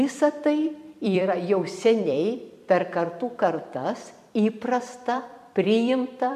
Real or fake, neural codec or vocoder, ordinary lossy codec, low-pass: fake; vocoder, 44.1 kHz, 128 mel bands every 256 samples, BigVGAN v2; MP3, 96 kbps; 14.4 kHz